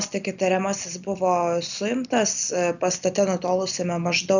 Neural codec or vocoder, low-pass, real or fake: none; 7.2 kHz; real